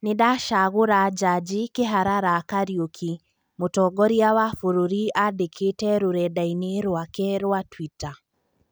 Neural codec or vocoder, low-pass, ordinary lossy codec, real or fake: none; none; none; real